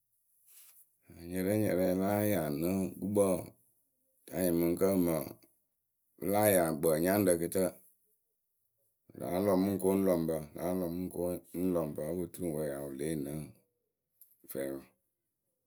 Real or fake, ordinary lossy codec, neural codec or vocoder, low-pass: real; none; none; none